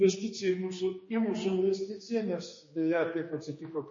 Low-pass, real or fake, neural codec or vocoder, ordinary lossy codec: 7.2 kHz; fake; codec, 16 kHz, 2 kbps, X-Codec, HuBERT features, trained on general audio; MP3, 32 kbps